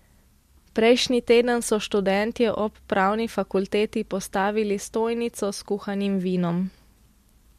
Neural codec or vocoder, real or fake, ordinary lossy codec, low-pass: none; real; MP3, 64 kbps; 14.4 kHz